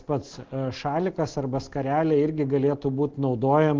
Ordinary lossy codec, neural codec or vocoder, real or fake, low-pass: Opus, 16 kbps; none; real; 7.2 kHz